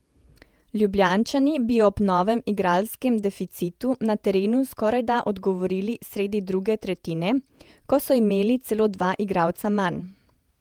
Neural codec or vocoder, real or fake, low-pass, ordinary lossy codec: vocoder, 48 kHz, 128 mel bands, Vocos; fake; 19.8 kHz; Opus, 32 kbps